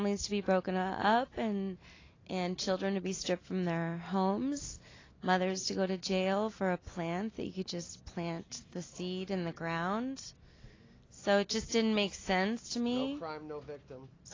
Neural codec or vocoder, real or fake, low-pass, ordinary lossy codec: none; real; 7.2 kHz; AAC, 32 kbps